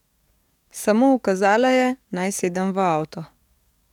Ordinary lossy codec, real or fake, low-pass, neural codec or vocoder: none; fake; 19.8 kHz; codec, 44.1 kHz, 7.8 kbps, DAC